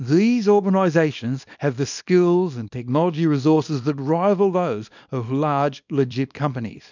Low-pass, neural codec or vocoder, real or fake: 7.2 kHz; codec, 24 kHz, 0.9 kbps, WavTokenizer, small release; fake